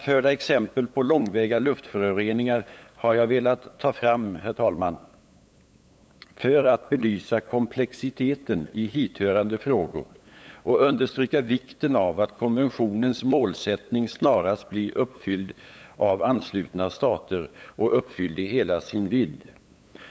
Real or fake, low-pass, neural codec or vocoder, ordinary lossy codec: fake; none; codec, 16 kHz, 8 kbps, FunCodec, trained on LibriTTS, 25 frames a second; none